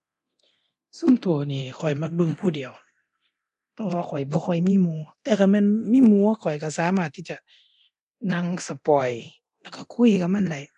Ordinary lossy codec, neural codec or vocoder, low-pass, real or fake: none; codec, 24 kHz, 0.9 kbps, DualCodec; 10.8 kHz; fake